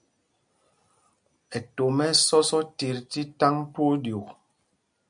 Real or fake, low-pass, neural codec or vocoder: real; 9.9 kHz; none